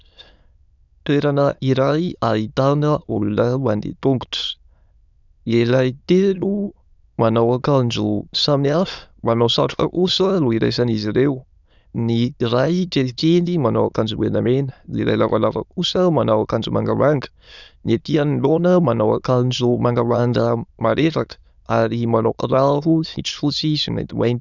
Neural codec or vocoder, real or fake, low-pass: autoencoder, 22.05 kHz, a latent of 192 numbers a frame, VITS, trained on many speakers; fake; 7.2 kHz